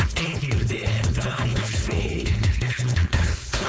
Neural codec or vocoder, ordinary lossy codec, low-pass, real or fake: codec, 16 kHz, 4.8 kbps, FACodec; none; none; fake